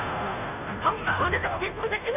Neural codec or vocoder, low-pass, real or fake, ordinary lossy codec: codec, 16 kHz, 0.5 kbps, FunCodec, trained on Chinese and English, 25 frames a second; 3.6 kHz; fake; none